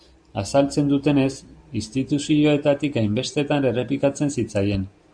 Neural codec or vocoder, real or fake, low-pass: none; real; 9.9 kHz